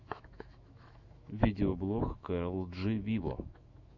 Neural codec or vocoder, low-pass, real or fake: autoencoder, 48 kHz, 128 numbers a frame, DAC-VAE, trained on Japanese speech; 7.2 kHz; fake